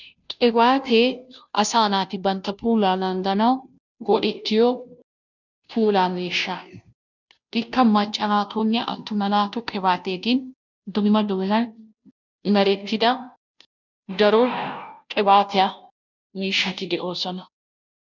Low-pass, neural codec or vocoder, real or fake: 7.2 kHz; codec, 16 kHz, 0.5 kbps, FunCodec, trained on Chinese and English, 25 frames a second; fake